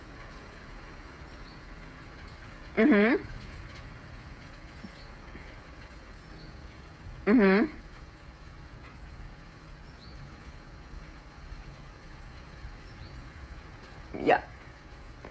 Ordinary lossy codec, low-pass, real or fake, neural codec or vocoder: none; none; fake; codec, 16 kHz, 16 kbps, FreqCodec, smaller model